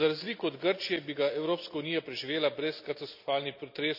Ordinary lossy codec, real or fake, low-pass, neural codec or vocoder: none; real; 5.4 kHz; none